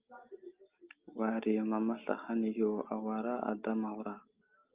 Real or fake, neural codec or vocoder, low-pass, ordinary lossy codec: real; none; 3.6 kHz; Opus, 24 kbps